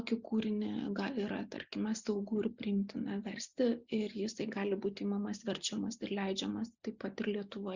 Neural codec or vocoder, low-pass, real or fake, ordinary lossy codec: none; 7.2 kHz; real; Opus, 64 kbps